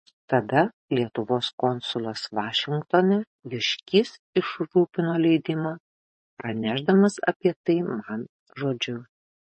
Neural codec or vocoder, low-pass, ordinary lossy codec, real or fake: vocoder, 24 kHz, 100 mel bands, Vocos; 10.8 kHz; MP3, 32 kbps; fake